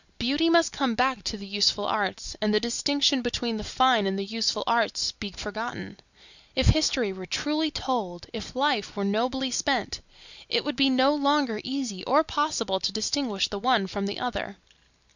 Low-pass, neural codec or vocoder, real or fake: 7.2 kHz; none; real